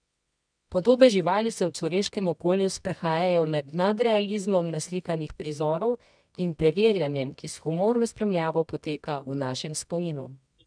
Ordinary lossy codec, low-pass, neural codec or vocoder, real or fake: none; 9.9 kHz; codec, 24 kHz, 0.9 kbps, WavTokenizer, medium music audio release; fake